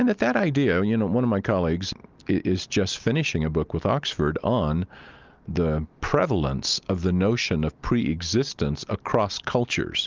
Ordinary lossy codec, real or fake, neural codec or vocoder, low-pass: Opus, 32 kbps; real; none; 7.2 kHz